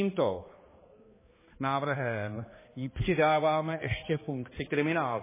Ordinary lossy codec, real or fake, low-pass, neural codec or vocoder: MP3, 16 kbps; fake; 3.6 kHz; codec, 16 kHz, 2 kbps, X-Codec, HuBERT features, trained on balanced general audio